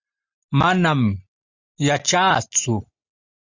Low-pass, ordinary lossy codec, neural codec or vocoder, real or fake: 7.2 kHz; Opus, 64 kbps; none; real